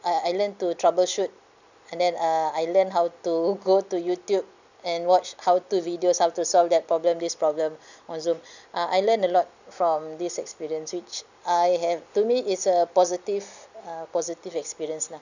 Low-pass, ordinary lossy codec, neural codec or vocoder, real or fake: 7.2 kHz; none; none; real